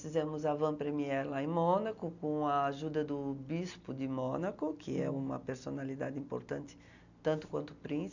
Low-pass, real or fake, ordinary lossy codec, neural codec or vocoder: 7.2 kHz; real; none; none